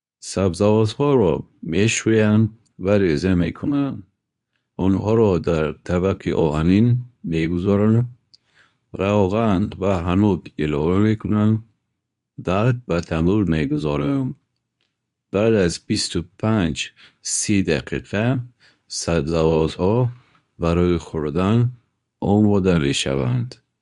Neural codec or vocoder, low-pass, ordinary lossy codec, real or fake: codec, 24 kHz, 0.9 kbps, WavTokenizer, medium speech release version 2; 10.8 kHz; none; fake